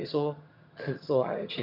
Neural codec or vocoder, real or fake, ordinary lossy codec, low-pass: vocoder, 22.05 kHz, 80 mel bands, HiFi-GAN; fake; none; 5.4 kHz